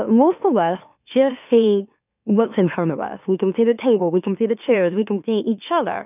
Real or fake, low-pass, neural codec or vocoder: fake; 3.6 kHz; autoencoder, 44.1 kHz, a latent of 192 numbers a frame, MeloTTS